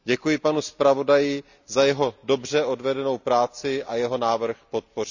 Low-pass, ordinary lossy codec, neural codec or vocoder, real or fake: 7.2 kHz; none; none; real